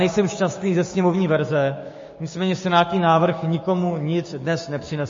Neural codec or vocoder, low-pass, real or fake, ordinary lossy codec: codec, 16 kHz, 6 kbps, DAC; 7.2 kHz; fake; MP3, 32 kbps